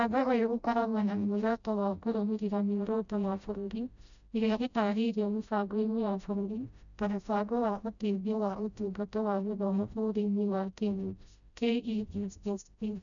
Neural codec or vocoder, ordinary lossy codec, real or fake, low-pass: codec, 16 kHz, 0.5 kbps, FreqCodec, smaller model; none; fake; 7.2 kHz